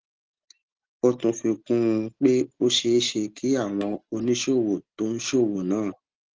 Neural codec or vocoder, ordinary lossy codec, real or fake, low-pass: none; Opus, 16 kbps; real; 7.2 kHz